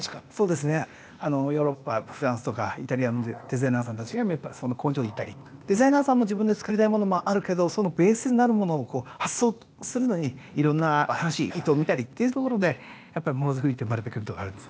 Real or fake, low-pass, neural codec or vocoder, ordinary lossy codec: fake; none; codec, 16 kHz, 0.8 kbps, ZipCodec; none